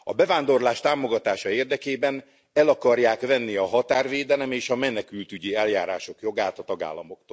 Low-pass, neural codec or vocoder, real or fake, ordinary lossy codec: none; none; real; none